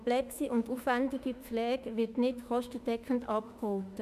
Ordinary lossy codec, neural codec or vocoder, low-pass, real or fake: none; autoencoder, 48 kHz, 32 numbers a frame, DAC-VAE, trained on Japanese speech; 14.4 kHz; fake